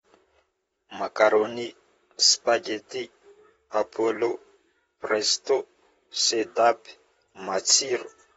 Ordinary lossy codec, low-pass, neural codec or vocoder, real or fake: AAC, 24 kbps; 19.8 kHz; vocoder, 44.1 kHz, 128 mel bands, Pupu-Vocoder; fake